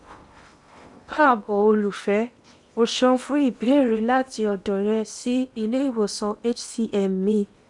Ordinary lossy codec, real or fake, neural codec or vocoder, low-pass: none; fake; codec, 16 kHz in and 24 kHz out, 0.8 kbps, FocalCodec, streaming, 65536 codes; 10.8 kHz